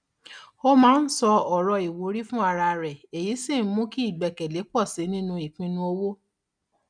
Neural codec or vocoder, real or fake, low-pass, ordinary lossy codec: none; real; 9.9 kHz; none